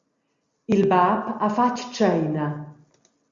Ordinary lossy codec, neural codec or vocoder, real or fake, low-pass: Opus, 64 kbps; none; real; 7.2 kHz